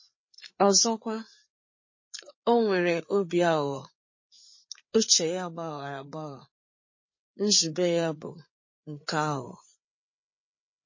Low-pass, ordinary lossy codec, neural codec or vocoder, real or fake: 7.2 kHz; MP3, 32 kbps; codec, 16 kHz, 4 kbps, FreqCodec, larger model; fake